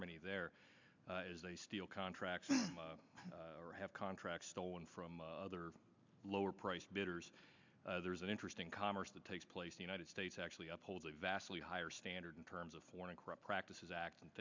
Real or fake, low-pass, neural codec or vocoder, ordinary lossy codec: real; 7.2 kHz; none; MP3, 64 kbps